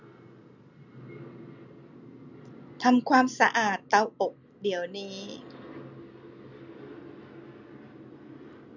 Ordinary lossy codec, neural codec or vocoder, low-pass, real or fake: none; none; 7.2 kHz; real